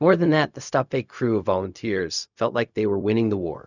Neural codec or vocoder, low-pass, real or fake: codec, 16 kHz, 0.4 kbps, LongCat-Audio-Codec; 7.2 kHz; fake